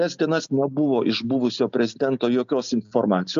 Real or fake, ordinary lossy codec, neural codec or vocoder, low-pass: real; AAC, 96 kbps; none; 7.2 kHz